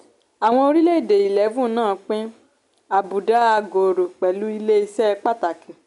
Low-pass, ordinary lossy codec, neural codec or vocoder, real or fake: 10.8 kHz; none; none; real